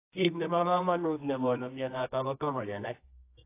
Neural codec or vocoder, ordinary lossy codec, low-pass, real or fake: codec, 24 kHz, 0.9 kbps, WavTokenizer, medium music audio release; AAC, 24 kbps; 3.6 kHz; fake